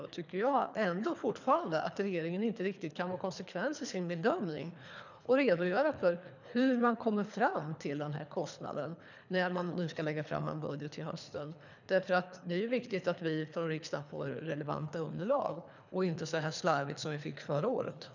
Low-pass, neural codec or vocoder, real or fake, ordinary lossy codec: 7.2 kHz; codec, 24 kHz, 3 kbps, HILCodec; fake; none